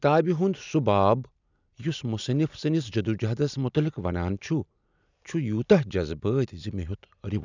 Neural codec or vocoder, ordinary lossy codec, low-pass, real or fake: none; none; 7.2 kHz; real